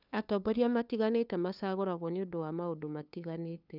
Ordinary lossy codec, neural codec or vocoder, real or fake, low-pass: none; codec, 16 kHz, 2 kbps, FunCodec, trained on LibriTTS, 25 frames a second; fake; 5.4 kHz